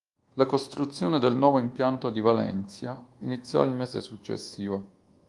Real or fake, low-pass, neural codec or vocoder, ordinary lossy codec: fake; 10.8 kHz; codec, 24 kHz, 1.2 kbps, DualCodec; Opus, 24 kbps